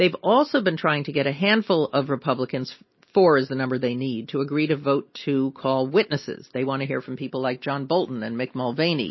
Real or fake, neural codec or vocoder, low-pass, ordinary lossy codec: real; none; 7.2 kHz; MP3, 24 kbps